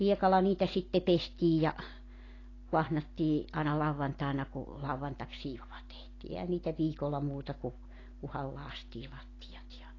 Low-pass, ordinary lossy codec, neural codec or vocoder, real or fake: 7.2 kHz; AAC, 32 kbps; none; real